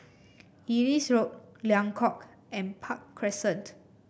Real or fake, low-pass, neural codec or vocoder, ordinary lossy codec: real; none; none; none